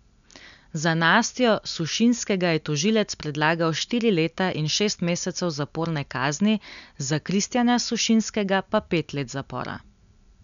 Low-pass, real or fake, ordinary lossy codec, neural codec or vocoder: 7.2 kHz; real; none; none